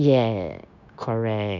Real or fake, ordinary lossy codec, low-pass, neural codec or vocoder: fake; none; 7.2 kHz; codec, 16 kHz, 0.9 kbps, LongCat-Audio-Codec